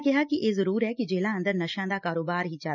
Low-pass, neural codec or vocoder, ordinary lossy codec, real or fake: 7.2 kHz; none; none; real